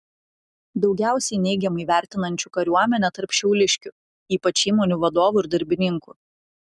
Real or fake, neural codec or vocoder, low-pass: real; none; 10.8 kHz